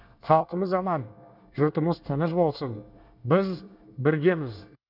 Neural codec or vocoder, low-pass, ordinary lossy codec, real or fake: codec, 24 kHz, 1 kbps, SNAC; 5.4 kHz; none; fake